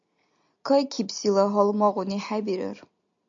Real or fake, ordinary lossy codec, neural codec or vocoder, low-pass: real; MP3, 48 kbps; none; 7.2 kHz